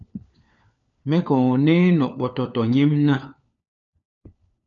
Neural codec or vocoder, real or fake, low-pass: codec, 16 kHz, 4 kbps, FunCodec, trained on LibriTTS, 50 frames a second; fake; 7.2 kHz